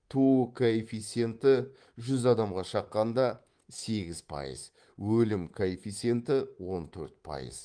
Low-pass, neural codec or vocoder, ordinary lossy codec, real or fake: 9.9 kHz; codec, 24 kHz, 3.1 kbps, DualCodec; Opus, 24 kbps; fake